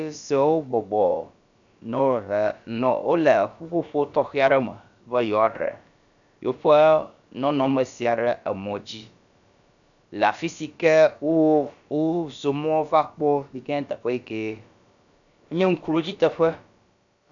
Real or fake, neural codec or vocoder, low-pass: fake; codec, 16 kHz, about 1 kbps, DyCAST, with the encoder's durations; 7.2 kHz